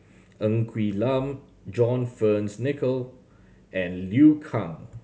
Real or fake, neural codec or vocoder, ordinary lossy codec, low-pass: real; none; none; none